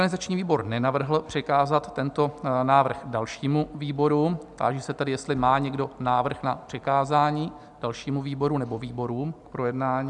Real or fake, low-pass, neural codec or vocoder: real; 10.8 kHz; none